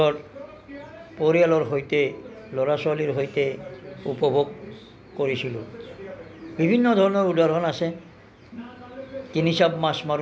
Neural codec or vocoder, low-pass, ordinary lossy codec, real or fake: none; none; none; real